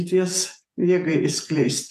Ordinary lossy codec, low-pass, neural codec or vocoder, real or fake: AAC, 96 kbps; 14.4 kHz; vocoder, 48 kHz, 128 mel bands, Vocos; fake